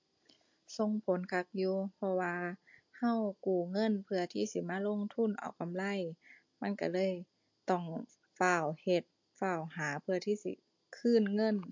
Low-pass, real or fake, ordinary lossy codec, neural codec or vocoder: 7.2 kHz; real; MP3, 48 kbps; none